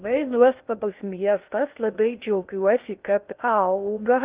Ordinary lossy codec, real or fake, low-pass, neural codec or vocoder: Opus, 24 kbps; fake; 3.6 kHz; codec, 16 kHz in and 24 kHz out, 0.6 kbps, FocalCodec, streaming, 2048 codes